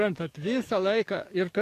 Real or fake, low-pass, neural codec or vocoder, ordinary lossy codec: fake; 14.4 kHz; codec, 44.1 kHz, 7.8 kbps, DAC; AAC, 64 kbps